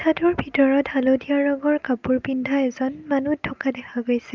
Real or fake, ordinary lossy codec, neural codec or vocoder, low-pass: real; Opus, 24 kbps; none; 7.2 kHz